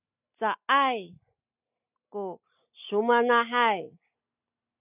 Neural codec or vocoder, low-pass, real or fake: none; 3.6 kHz; real